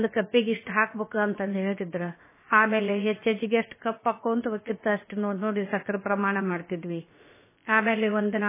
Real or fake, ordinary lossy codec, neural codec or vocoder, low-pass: fake; MP3, 16 kbps; codec, 16 kHz, about 1 kbps, DyCAST, with the encoder's durations; 3.6 kHz